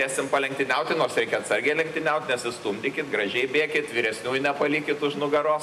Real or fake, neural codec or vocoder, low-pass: real; none; 14.4 kHz